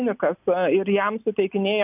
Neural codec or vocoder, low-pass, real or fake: none; 3.6 kHz; real